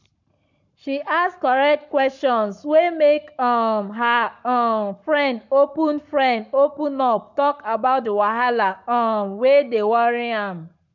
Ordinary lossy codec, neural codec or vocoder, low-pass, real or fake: none; codec, 44.1 kHz, 7.8 kbps, Pupu-Codec; 7.2 kHz; fake